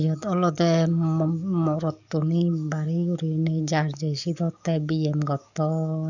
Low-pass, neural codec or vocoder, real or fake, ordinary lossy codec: 7.2 kHz; codec, 44.1 kHz, 7.8 kbps, DAC; fake; none